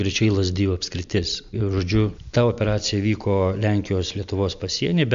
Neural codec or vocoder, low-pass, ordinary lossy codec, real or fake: none; 7.2 kHz; MP3, 64 kbps; real